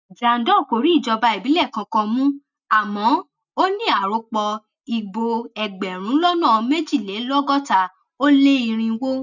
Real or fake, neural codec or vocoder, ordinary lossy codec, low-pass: real; none; none; 7.2 kHz